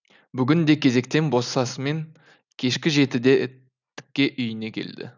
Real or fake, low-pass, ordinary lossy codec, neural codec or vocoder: real; 7.2 kHz; none; none